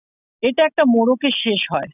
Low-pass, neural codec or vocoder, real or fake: 3.6 kHz; none; real